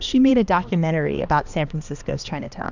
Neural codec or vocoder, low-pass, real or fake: codec, 16 kHz, 2 kbps, X-Codec, HuBERT features, trained on balanced general audio; 7.2 kHz; fake